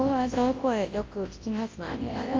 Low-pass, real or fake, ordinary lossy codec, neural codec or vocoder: 7.2 kHz; fake; Opus, 32 kbps; codec, 24 kHz, 0.9 kbps, WavTokenizer, large speech release